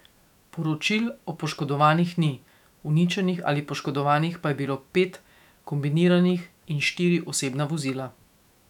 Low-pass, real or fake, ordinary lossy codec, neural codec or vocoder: 19.8 kHz; fake; none; autoencoder, 48 kHz, 128 numbers a frame, DAC-VAE, trained on Japanese speech